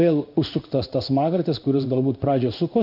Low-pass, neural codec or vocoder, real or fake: 5.4 kHz; codec, 16 kHz in and 24 kHz out, 1 kbps, XY-Tokenizer; fake